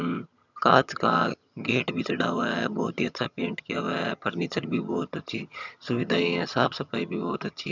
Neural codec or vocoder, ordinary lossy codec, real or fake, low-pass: vocoder, 22.05 kHz, 80 mel bands, HiFi-GAN; none; fake; 7.2 kHz